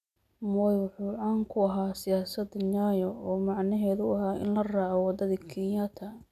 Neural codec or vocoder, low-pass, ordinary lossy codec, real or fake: none; 14.4 kHz; none; real